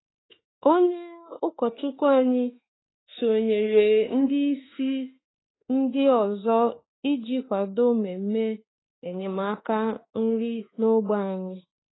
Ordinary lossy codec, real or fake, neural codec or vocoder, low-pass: AAC, 16 kbps; fake; autoencoder, 48 kHz, 32 numbers a frame, DAC-VAE, trained on Japanese speech; 7.2 kHz